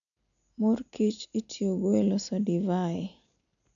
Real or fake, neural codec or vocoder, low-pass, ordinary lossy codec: real; none; 7.2 kHz; none